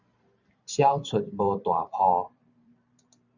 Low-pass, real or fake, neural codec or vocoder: 7.2 kHz; fake; vocoder, 44.1 kHz, 128 mel bands every 512 samples, BigVGAN v2